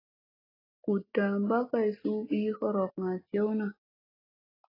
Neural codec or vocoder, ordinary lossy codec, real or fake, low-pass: none; AAC, 24 kbps; real; 5.4 kHz